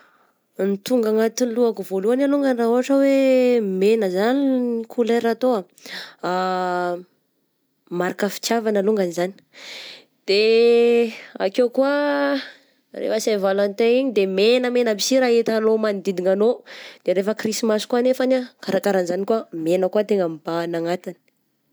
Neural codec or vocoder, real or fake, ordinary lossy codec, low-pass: none; real; none; none